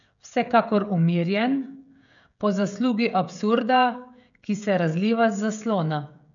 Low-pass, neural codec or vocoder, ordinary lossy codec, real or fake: 7.2 kHz; codec, 16 kHz, 16 kbps, FreqCodec, smaller model; none; fake